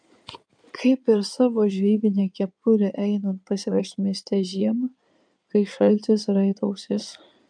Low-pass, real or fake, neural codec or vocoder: 9.9 kHz; fake; codec, 16 kHz in and 24 kHz out, 2.2 kbps, FireRedTTS-2 codec